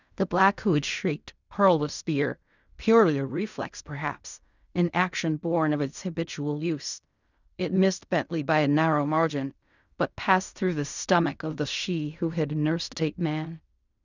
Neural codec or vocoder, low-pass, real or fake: codec, 16 kHz in and 24 kHz out, 0.4 kbps, LongCat-Audio-Codec, fine tuned four codebook decoder; 7.2 kHz; fake